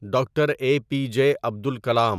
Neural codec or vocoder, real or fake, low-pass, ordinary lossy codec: none; real; 14.4 kHz; none